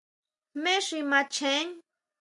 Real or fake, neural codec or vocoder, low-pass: real; none; 10.8 kHz